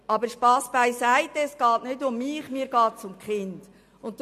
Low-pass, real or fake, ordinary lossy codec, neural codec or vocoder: 14.4 kHz; real; MP3, 64 kbps; none